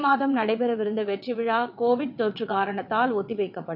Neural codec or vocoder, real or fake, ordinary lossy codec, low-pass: vocoder, 22.05 kHz, 80 mel bands, WaveNeXt; fake; none; 5.4 kHz